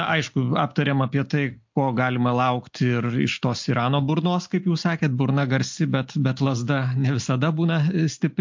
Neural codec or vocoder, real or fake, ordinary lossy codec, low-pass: none; real; MP3, 48 kbps; 7.2 kHz